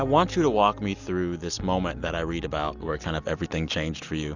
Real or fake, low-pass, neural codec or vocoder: real; 7.2 kHz; none